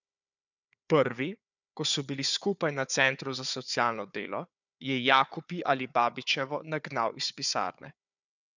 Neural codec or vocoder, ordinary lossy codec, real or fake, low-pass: codec, 16 kHz, 4 kbps, FunCodec, trained on Chinese and English, 50 frames a second; none; fake; 7.2 kHz